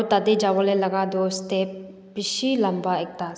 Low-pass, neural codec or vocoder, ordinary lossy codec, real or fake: none; none; none; real